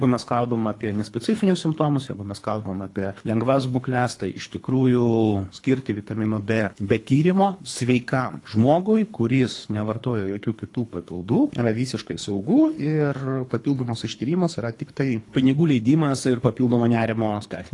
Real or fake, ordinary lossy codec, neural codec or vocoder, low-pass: fake; AAC, 48 kbps; codec, 24 kHz, 3 kbps, HILCodec; 10.8 kHz